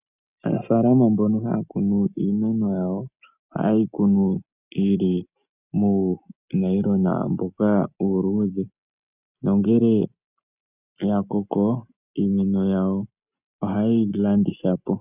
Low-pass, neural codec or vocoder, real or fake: 3.6 kHz; none; real